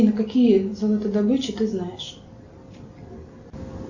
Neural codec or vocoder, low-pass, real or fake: none; 7.2 kHz; real